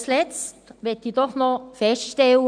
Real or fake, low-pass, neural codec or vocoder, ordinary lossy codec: real; 9.9 kHz; none; none